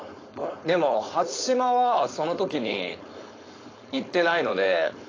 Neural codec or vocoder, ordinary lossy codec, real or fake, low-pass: codec, 16 kHz, 4.8 kbps, FACodec; AAC, 32 kbps; fake; 7.2 kHz